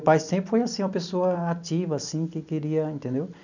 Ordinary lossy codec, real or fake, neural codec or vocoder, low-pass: none; fake; vocoder, 44.1 kHz, 128 mel bands every 256 samples, BigVGAN v2; 7.2 kHz